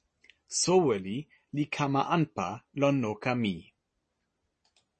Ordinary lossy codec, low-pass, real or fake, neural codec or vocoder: MP3, 32 kbps; 9.9 kHz; real; none